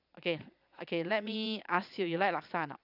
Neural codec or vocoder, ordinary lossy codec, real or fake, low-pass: vocoder, 22.05 kHz, 80 mel bands, Vocos; none; fake; 5.4 kHz